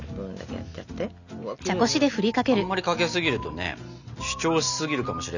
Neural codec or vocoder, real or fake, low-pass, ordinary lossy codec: none; real; 7.2 kHz; none